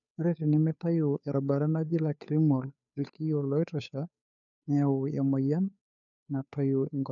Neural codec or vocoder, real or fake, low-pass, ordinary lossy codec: codec, 16 kHz, 2 kbps, FunCodec, trained on Chinese and English, 25 frames a second; fake; 7.2 kHz; none